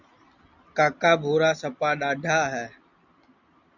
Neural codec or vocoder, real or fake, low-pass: none; real; 7.2 kHz